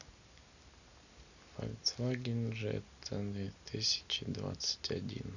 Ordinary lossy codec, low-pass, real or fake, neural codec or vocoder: none; 7.2 kHz; real; none